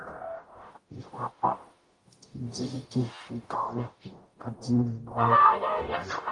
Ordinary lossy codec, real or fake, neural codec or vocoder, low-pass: Opus, 32 kbps; fake; codec, 44.1 kHz, 0.9 kbps, DAC; 10.8 kHz